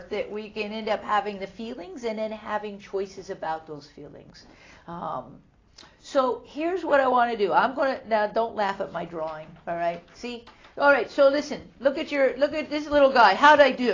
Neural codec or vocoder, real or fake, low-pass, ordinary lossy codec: none; real; 7.2 kHz; AAC, 32 kbps